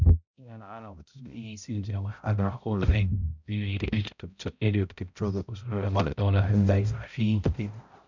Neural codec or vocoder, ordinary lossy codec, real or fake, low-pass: codec, 16 kHz, 0.5 kbps, X-Codec, HuBERT features, trained on balanced general audio; none; fake; 7.2 kHz